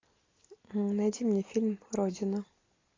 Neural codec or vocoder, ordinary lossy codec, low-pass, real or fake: none; AAC, 32 kbps; 7.2 kHz; real